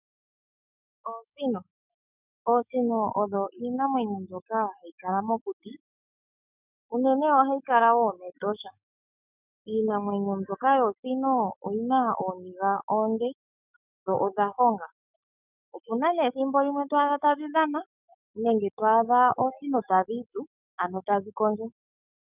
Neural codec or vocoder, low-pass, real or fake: autoencoder, 48 kHz, 128 numbers a frame, DAC-VAE, trained on Japanese speech; 3.6 kHz; fake